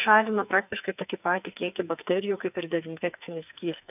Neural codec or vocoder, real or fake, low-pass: codec, 44.1 kHz, 2.6 kbps, SNAC; fake; 3.6 kHz